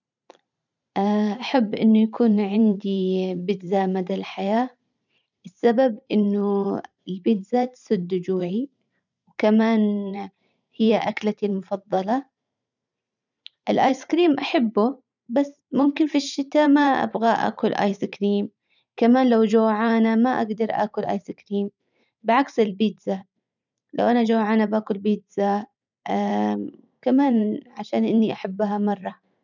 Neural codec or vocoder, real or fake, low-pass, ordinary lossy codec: vocoder, 44.1 kHz, 128 mel bands every 512 samples, BigVGAN v2; fake; 7.2 kHz; none